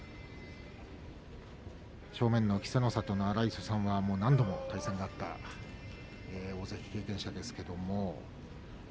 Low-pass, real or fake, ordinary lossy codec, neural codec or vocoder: none; real; none; none